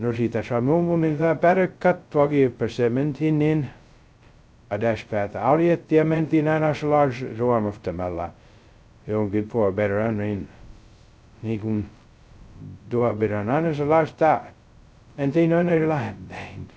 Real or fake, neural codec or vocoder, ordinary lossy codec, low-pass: fake; codec, 16 kHz, 0.2 kbps, FocalCodec; none; none